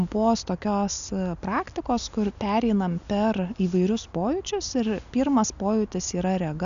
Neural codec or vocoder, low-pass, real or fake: none; 7.2 kHz; real